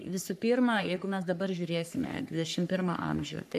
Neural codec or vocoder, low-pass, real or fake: codec, 44.1 kHz, 3.4 kbps, Pupu-Codec; 14.4 kHz; fake